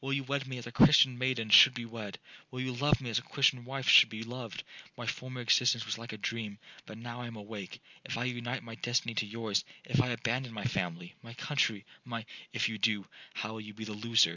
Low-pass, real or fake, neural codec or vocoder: 7.2 kHz; real; none